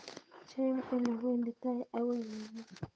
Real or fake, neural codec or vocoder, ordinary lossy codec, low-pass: fake; codec, 16 kHz, 8 kbps, FunCodec, trained on Chinese and English, 25 frames a second; none; none